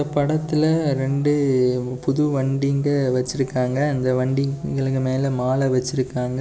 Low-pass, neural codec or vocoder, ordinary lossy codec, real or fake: none; none; none; real